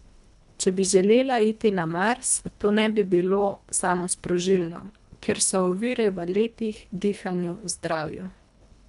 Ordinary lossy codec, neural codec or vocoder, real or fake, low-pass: none; codec, 24 kHz, 1.5 kbps, HILCodec; fake; 10.8 kHz